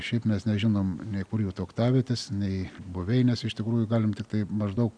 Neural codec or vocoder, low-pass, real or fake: none; 9.9 kHz; real